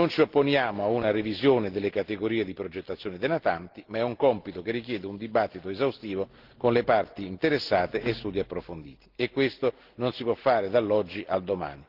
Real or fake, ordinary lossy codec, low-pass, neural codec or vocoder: real; Opus, 16 kbps; 5.4 kHz; none